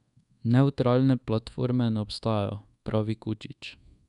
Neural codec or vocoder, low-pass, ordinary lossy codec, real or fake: codec, 24 kHz, 1.2 kbps, DualCodec; 10.8 kHz; none; fake